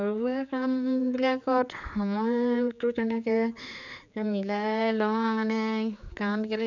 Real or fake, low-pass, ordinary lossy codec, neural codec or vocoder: fake; 7.2 kHz; none; codec, 16 kHz, 4 kbps, X-Codec, HuBERT features, trained on general audio